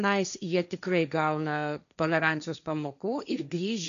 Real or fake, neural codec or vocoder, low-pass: fake; codec, 16 kHz, 1.1 kbps, Voila-Tokenizer; 7.2 kHz